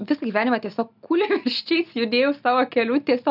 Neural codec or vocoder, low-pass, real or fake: none; 5.4 kHz; real